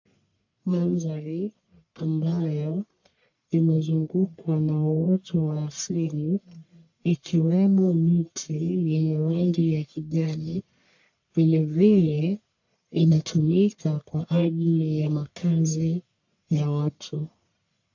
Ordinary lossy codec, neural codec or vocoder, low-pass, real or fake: AAC, 48 kbps; codec, 44.1 kHz, 1.7 kbps, Pupu-Codec; 7.2 kHz; fake